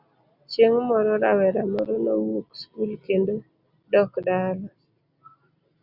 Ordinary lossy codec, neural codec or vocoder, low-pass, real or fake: Opus, 64 kbps; none; 5.4 kHz; real